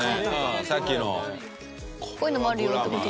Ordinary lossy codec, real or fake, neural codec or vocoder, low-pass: none; real; none; none